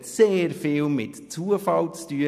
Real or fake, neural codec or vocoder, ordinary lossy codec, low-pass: real; none; none; 14.4 kHz